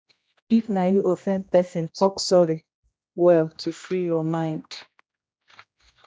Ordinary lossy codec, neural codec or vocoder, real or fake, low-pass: none; codec, 16 kHz, 1 kbps, X-Codec, HuBERT features, trained on general audio; fake; none